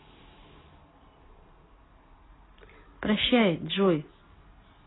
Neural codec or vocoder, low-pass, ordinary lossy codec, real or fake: none; 7.2 kHz; AAC, 16 kbps; real